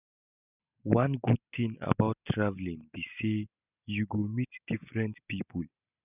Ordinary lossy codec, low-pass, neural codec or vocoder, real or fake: none; 3.6 kHz; none; real